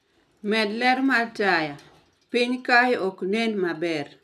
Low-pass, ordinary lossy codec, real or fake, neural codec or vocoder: 14.4 kHz; none; real; none